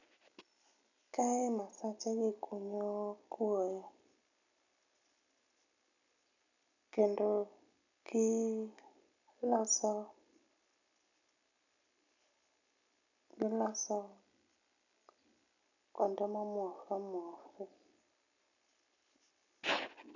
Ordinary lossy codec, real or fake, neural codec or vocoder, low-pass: none; real; none; 7.2 kHz